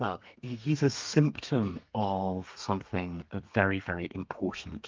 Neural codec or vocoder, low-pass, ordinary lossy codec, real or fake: codec, 32 kHz, 1.9 kbps, SNAC; 7.2 kHz; Opus, 24 kbps; fake